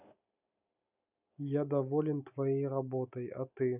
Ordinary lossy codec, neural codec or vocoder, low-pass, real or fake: none; none; 3.6 kHz; real